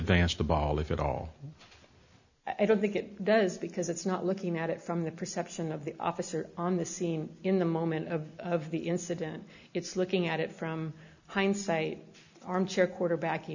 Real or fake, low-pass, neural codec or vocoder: real; 7.2 kHz; none